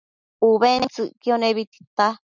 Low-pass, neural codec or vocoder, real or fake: 7.2 kHz; none; real